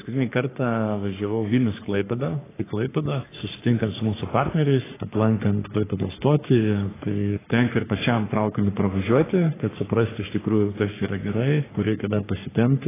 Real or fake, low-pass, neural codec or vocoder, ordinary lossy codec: fake; 3.6 kHz; codec, 44.1 kHz, 3.4 kbps, Pupu-Codec; AAC, 16 kbps